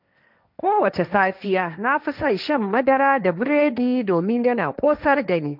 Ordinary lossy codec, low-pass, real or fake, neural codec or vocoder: none; 5.4 kHz; fake; codec, 16 kHz, 1.1 kbps, Voila-Tokenizer